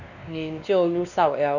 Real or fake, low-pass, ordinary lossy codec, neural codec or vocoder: fake; 7.2 kHz; none; codec, 16 kHz, 2 kbps, X-Codec, WavLM features, trained on Multilingual LibriSpeech